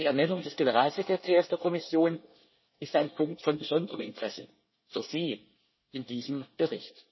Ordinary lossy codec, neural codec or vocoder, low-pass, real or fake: MP3, 24 kbps; codec, 24 kHz, 1 kbps, SNAC; 7.2 kHz; fake